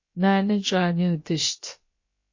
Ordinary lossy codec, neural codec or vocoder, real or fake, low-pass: MP3, 32 kbps; codec, 16 kHz, about 1 kbps, DyCAST, with the encoder's durations; fake; 7.2 kHz